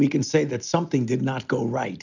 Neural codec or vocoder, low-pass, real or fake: none; 7.2 kHz; real